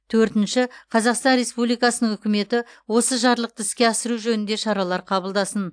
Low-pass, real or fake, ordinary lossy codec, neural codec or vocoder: 9.9 kHz; real; AAC, 64 kbps; none